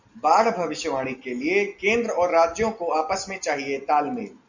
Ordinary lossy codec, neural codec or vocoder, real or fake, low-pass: Opus, 64 kbps; none; real; 7.2 kHz